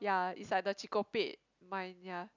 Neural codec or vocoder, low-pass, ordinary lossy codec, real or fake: none; 7.2 kHz; none; real